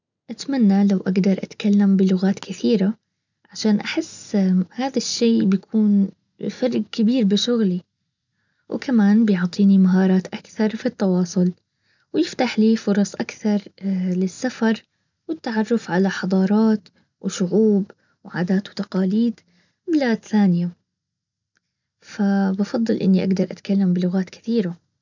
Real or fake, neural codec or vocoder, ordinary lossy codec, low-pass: real; none; none; 7.2 kHz